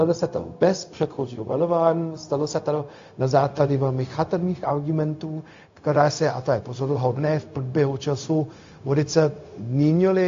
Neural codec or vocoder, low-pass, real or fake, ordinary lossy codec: codec, 16 kHz, 0.4 kbps, LongCat-Audio-Codec; 7.2 kHz; fake; AAC, 48 kbps